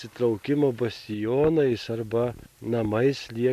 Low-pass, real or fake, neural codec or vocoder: 14.4 kHz; real; none